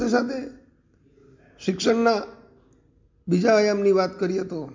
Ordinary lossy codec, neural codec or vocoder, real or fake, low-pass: MP3, 48 kbps; none; real; 7.2 kHz